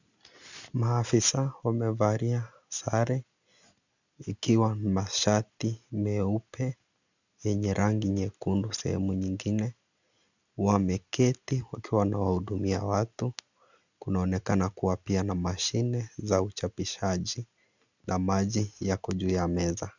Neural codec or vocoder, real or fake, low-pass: none; real; 7.2 kHz